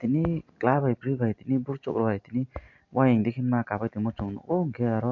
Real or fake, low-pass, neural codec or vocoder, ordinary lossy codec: real; 7.2 kHz; none; MP3, 48 kbps